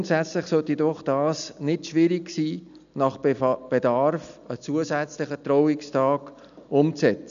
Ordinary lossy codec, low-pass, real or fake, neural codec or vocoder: none; 7.2 kHz; real; none